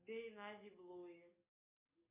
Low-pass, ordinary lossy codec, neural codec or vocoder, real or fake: 3.6 kHz; MP3, 24 kbps; codec, 44.1 kHz, 7.8 kbps, DAC; fake